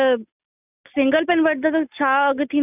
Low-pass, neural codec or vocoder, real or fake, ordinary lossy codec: 3.6 kHz; none; real; none